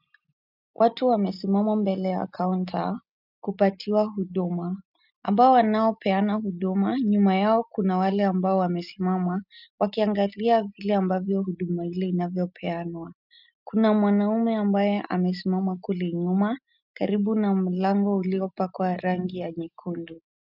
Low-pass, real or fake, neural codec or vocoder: 5.4 kHz; real; none